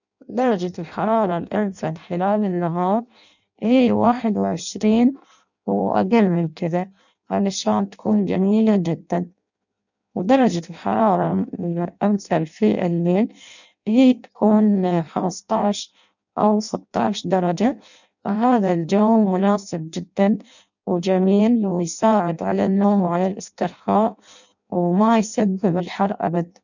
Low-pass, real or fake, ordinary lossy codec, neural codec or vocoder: 7.2 kHz; fake; none; codec, 16 kHz in and 24 kHz out, 0.6 kbps, FireRedTTS-2 codec